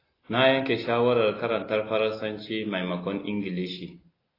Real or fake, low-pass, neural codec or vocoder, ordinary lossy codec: real; 5.4 kHz; none; AAC, 24 kbps